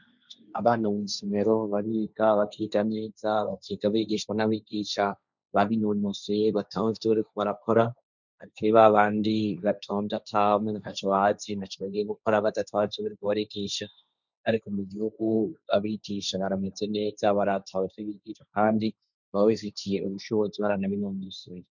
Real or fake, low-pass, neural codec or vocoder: fake; 7.2 kHz; codec, 16 kHz, 1.1 kbps, Voila-Tokenizer